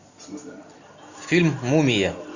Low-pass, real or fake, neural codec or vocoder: 7.2 kHz; real; none